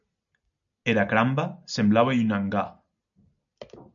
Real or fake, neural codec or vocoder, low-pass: real; none; 7.2 kHz